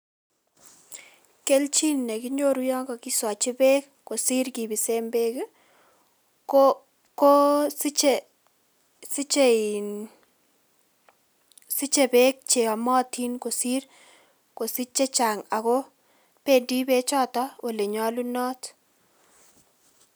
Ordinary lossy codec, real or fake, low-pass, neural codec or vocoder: none; real; none; none